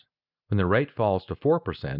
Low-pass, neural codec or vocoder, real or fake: 5.4 kHz; none; real